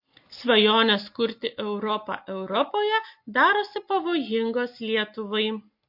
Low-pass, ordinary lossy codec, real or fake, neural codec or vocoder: 5.4 kHz; MP3, 32 kbps; real; none